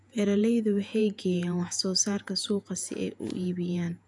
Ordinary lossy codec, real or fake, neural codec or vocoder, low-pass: none; fake; vocoder, 48 kHz, 128 mel bands, Vocos; 10.8 kHz